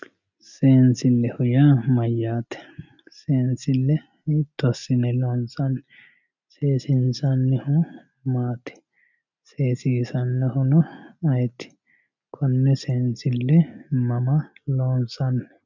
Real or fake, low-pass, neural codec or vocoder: real; 7.2 kHz; none